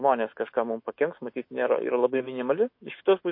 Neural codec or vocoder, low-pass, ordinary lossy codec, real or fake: autoencoder, 48 kHz, 128 numbers a frame, DAC-VAE, trained on Japanese speech; 5.4 kHz; MP3, 32 kbps; fake